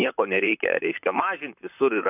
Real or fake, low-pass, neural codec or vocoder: fake; 3.6 kHz; vocoder, 44.1 kHz, 128 mel bands, Pupu-Vocoder